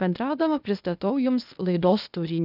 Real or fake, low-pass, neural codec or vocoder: fake; 5.4 kHz; codec, 16 kHz, 0.8 kbps, ZipCodec